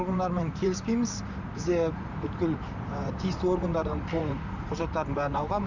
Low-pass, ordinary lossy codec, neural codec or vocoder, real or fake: 7.2 kHz; none; vocoder, 44.1 kHz, 128 mel bands, Pupu-Vocoder; fake